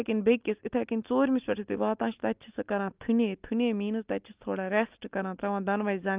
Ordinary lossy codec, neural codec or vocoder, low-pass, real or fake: Opus, 32 kbps; none; 3.6 kHz; real